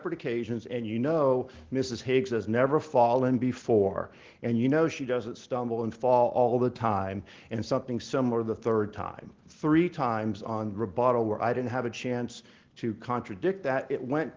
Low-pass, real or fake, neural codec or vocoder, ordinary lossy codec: 7.2 kHz; real; none; Opus, 16 kbps